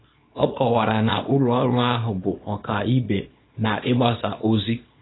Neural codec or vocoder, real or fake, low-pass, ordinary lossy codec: codec, 24 kHz, 0.9 kbps, WavTokenizer, small release; fake; 7.2 kHz; AAC, 16 kbps